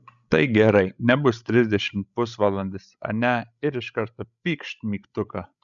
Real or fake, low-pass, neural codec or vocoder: fake; 7.2 kHz; codec, 16 kHz, 16 kbps, FreqCodec, larger model